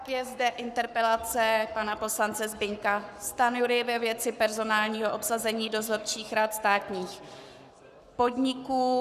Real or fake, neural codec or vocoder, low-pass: fake; codec, 44.1 kHz, 7.8 kbps, Pupu-Codec; 14.4 kHz